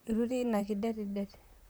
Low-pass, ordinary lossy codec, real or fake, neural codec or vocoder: none; none; fake; vocoder, 44.1 kHz, 128 mel bands, Pupu-Vocoder